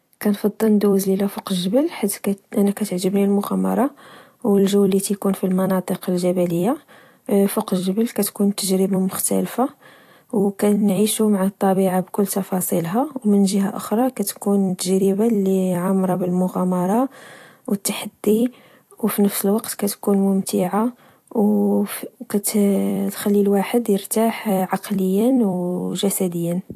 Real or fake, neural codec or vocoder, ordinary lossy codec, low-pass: fake; vocoder, 44.1 kHz, 128 mel bands every 256 samples, BigVGAN v2; AAC, 64 kbps; 14.4 kHz